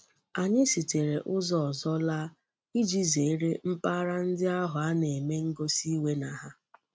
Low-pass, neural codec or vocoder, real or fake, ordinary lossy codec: none; none; real; none